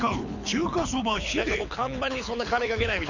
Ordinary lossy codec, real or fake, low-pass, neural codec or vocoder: none; fake; 7.2 kHz; codec, 24 kHz, 6 kbps, HILCodec